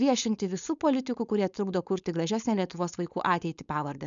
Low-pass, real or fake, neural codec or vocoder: 7.2 kHz; fake; codec, 16 kHz, 4.8 kbps, FACodec